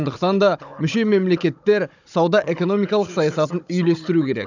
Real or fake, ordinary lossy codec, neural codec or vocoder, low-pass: fake; none; codec, 16 kHz, 16 kbps, FunCodec, trained on Chinese and English, 50 frames a second; 7.2 kHz